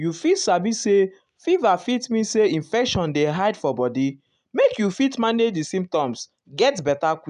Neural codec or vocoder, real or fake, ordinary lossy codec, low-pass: none; real; none; 10.8 kHz